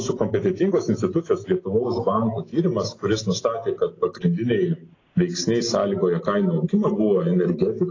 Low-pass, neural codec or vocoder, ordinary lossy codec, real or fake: 7.2 kHz; none; AAC, 32 kbps; real